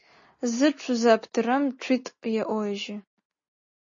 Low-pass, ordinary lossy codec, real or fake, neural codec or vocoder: 7.2 kHz; MP3, 32 kbps; real; none